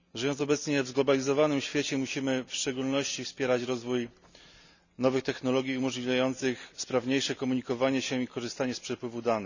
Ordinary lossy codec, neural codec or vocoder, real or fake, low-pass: none; none; real; 7.2 kHz